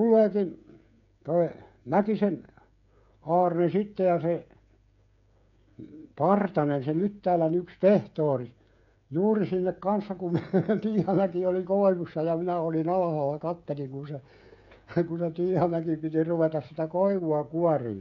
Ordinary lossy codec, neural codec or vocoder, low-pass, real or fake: none; codec, 16 kHz, 16 kbps, FreqCodec, smaller model; 7.2 kHz; fake